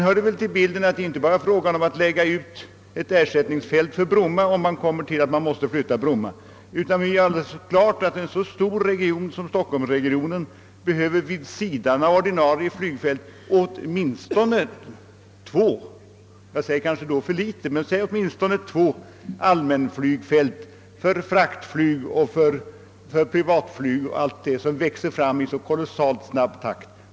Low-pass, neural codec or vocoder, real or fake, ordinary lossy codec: none; none; real; none